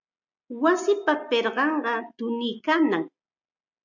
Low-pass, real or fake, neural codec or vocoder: 7.2 kHz; real; none